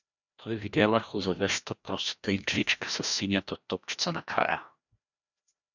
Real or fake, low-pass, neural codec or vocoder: fake; 7.2 kHz; codec, 16 kHz, 1 kbps, FreqCodec, larger model